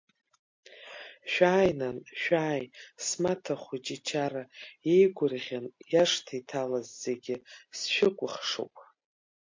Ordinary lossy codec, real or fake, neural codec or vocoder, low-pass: MP3, 48 kbps; real; none; 7.2 kHz